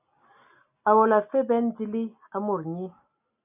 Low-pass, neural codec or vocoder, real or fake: 3.6 kHz; none; real